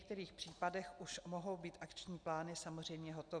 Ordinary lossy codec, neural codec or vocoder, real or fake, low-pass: Opus, 64 kbps; none; real; 9.9 kHz